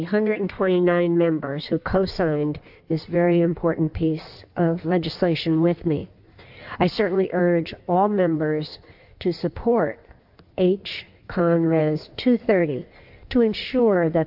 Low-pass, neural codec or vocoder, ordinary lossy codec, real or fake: 5.4 kHz; codec, 16 kHz in and 24 kHz out, 1.1 kbps, FireRedTTS-2 codec; AAC, 48 kbps; fake